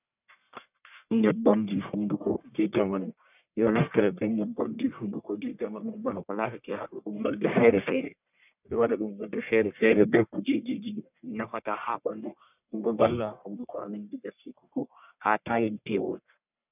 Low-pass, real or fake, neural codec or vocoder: 3.6 kHz; fake; codec, 44.1 kHz, 1.7 kbps, Pupu-Codec